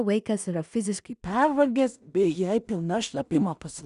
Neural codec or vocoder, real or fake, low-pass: codec, 16 kHz in and 24 kHz out, 0.4 kbps, LongCat-Audio-Codec, two codebook decoder; fake; 10.8 kHz